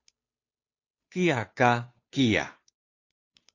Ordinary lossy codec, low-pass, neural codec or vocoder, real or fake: AAC, 48 kbps; 7.2 kHz; codec, 16 kHz, 2 kbps, FunCodec, trained on Chinese and English, 25 frames a second; fake